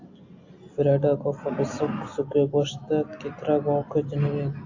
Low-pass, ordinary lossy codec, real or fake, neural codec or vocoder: 7.2 kHz; AAC, 48 kbps; real; none